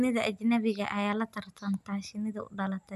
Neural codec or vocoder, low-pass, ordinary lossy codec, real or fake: none; 14.4 kHz; none; real